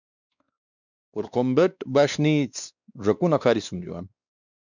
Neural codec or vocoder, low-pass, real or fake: codec, 16 kHz, 2 kbps, X-Codec, WavLM features, trained on Multilingual LibriSpeech; 7.2 kHz; fake